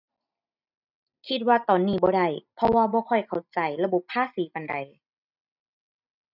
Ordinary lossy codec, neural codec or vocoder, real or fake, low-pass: none; none; real; 5.4 kHz